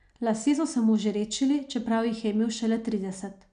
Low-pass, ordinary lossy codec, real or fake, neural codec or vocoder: 9.9 kHz; none; real; none